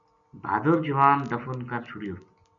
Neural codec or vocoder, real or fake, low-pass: none; real; 7.2 kHz